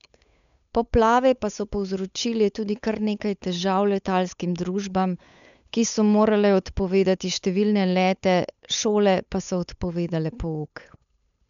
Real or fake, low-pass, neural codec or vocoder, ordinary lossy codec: fake; 7.2 kHz; codec, 16 kHz, 8 kbps, FunCodec, trained on Chinese and English, 25 frames a second; none